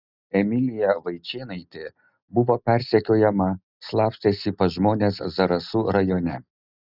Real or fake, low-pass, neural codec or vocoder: real; 5.4 kHz; none